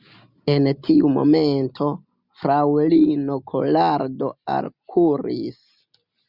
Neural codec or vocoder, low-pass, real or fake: none; 5.4 kHz; real